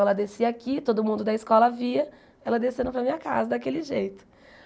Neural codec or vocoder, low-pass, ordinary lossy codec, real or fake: none; none; none; real